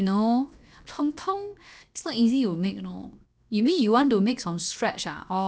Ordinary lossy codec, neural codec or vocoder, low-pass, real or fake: none; codec, 16 kHz, 0.7 kbps, FocalCodec; none; fake